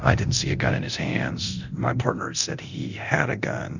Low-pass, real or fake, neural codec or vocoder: 7.2 kHz; fake; codec, 24 kHz, 0.9 kbps, DualCodec